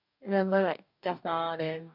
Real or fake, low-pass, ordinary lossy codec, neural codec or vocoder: fake; 5.4 kHz; none; codec, 44.1 kHz, 2.6 kbps, DAC